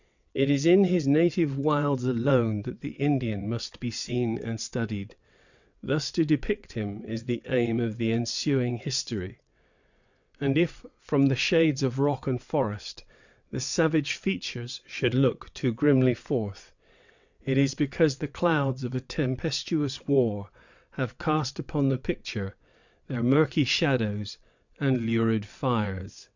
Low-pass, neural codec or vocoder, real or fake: 7.2 kHz; vocoder, 22.05 kHz, 80 mel bands, WaveNeXt; fake